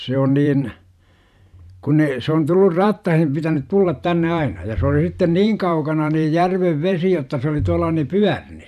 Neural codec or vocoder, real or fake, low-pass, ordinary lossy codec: vocoder, 44.1 kHz, 128 mel bands every 512 samples, BigVGAN v2; fake; 14.4 kHz; none